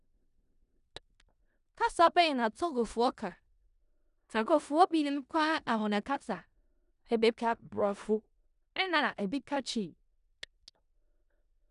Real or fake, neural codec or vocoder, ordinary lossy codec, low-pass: fake; codec, 16 kHz in and 24 kHz out, 0.4 kbps, LongCat-Audio-Codec, four codebook decoder; none; 10.8 kHz